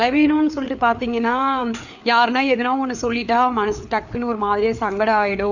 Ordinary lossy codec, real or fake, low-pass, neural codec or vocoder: none; fake; 7.2 kHz; codec, 16 kHz, 4 kbps, FreqCodec, larger model